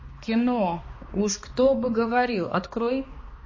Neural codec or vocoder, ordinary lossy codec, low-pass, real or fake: codec, 16 kHz, 2 kbps, X-Codec, HuBERT features, trained on balanced general audio; MP3, 32 kbps; 7.2 kHz; fake